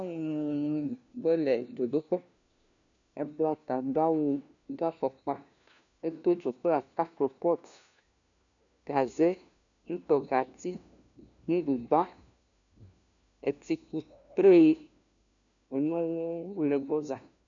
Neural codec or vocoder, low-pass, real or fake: codec, 16 kHz, 1 kbps, FunCodec, trained on LibriTTS, 50 frames a second; 7.2 kHz; fake